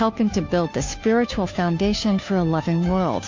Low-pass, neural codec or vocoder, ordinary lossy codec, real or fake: 7.2 kHz; codec, 16 kHz, 2 kbps, FunCodec, trained on Chinese and English, 25 frames a second; MP3, 48 kbps; fake